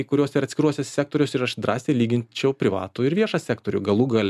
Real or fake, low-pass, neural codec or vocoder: real; 14.4 kHz; none